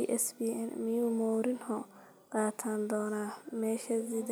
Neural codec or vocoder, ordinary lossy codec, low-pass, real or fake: none; none; none; real